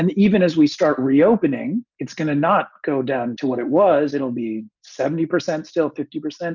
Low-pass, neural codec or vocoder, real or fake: 7.2 kHz; none; real